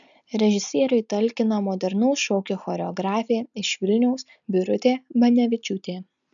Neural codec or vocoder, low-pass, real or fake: none; 7.2 kHz; real